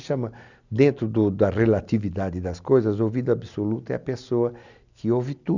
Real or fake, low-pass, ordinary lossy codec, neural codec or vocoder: real; 7.2 kHz; none; none